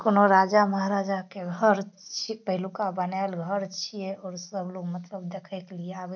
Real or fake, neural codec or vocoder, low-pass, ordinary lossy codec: real; none; 7.2 kHz; none